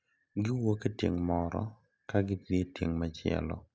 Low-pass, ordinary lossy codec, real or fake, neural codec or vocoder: none; none; real; none